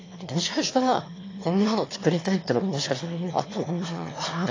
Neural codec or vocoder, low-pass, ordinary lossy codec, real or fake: autoencoder, 22.05 kHz, a latent of 192 numbers a frame, VITS, trained on one speaker; 7.2 kHz; AAC, 32 kbps; fake